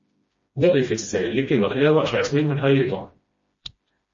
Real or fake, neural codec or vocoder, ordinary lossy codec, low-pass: fake; codec, 16 kHz, 1 kbps, FreqCodec, smaller model; MP3, 32 kbps; 7.2 kHz